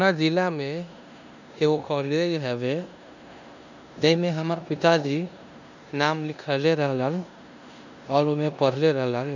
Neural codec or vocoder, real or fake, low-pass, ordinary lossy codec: codec, 16 kHz in and 24 kHz out, 0.9 kbps, LongCat-Audio-Codec, four codebook decoder; fake; 7.2 kHz; none